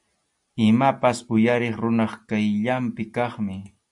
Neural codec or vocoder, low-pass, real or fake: none; 10.8 kHz; real